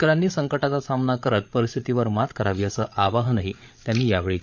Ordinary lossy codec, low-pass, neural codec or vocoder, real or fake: none; 7.2 kHz; codec, 16 kHz, 8 kbps, FreqCodec, larger model; fake